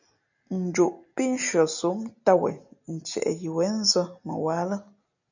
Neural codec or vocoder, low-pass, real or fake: none; 7.2 kHz; real